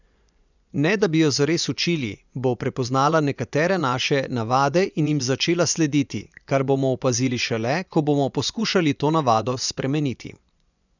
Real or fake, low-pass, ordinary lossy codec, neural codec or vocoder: fake; 7.2 kHz; none; vocoder, 24 kHz, 100 mel bands, Vocos